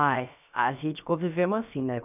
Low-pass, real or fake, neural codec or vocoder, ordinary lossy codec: 3.6 kHz; fake; codec, 16 kHz, about 1 kbps, DyCAST, with the encoder's durations; none